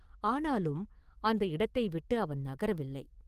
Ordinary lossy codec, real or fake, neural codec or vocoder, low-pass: Opus, 24 kbps; fake; codec, 44.1 kHz, 7.8 kbps, DAC; 14.4 kHz